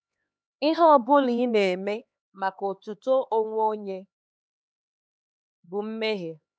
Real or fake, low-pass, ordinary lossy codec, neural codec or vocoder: fake; none; none; codec, 16 kHz, 4 kbps, X-Codec, HuBERT features, trained on LibriSpeech